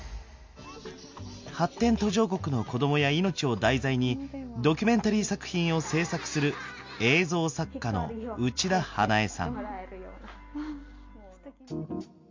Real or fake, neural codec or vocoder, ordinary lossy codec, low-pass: real; none; MP3, 48 kbps; 7.2 kHz